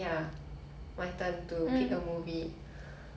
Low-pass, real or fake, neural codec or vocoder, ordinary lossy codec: none; real; none; none